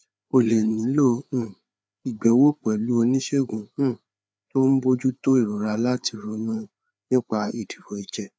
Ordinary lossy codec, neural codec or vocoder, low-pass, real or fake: none; codec, 16 kHz, 4 kbps, FreqCodec, larger model; none; fake